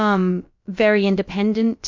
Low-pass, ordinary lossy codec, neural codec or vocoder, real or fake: 7.2 kHz; MP3, 48 kbps; codec, 16 kHz, about 1 kbps, DyCAST, with the encoder's durations; fake